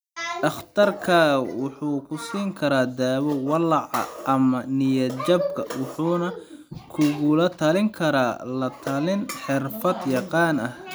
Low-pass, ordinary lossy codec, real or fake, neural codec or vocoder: none; none; real; none